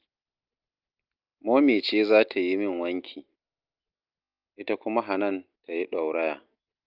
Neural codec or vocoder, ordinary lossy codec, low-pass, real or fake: none; Opus, 24 kbps; 5.4 kHz; real